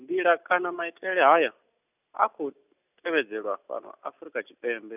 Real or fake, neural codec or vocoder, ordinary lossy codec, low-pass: real; none; none; 3.6 kHz